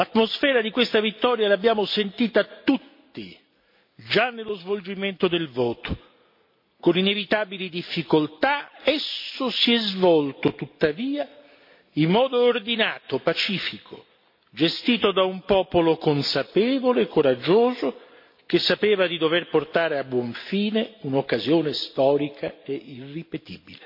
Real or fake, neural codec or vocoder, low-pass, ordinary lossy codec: real; none; 5.4 kHz; MP3, 32 kbps